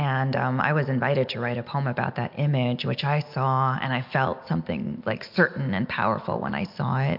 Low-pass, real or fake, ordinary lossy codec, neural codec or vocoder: 5.4 kHz; real; MP3, 48 kbps; none